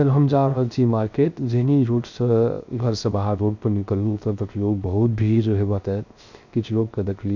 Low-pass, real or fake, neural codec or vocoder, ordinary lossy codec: 7.2 kHz; fake; codec, 16 kHz, 0.3 kbps, FocalCodec; none